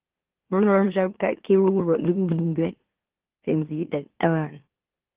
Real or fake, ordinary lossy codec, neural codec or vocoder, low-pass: fake; Opus, 16 kbps; autoencoder, 44.1 kHz, a latent of 192 numbers a frame, MeloTTS; 3.6 kHz